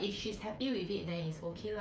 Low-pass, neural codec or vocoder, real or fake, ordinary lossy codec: none; codec, 16 kHz, 8 kbps, FreqCodec, smaller model; fake; none